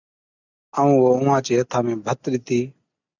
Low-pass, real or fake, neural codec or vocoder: 7.2 kHz; real; none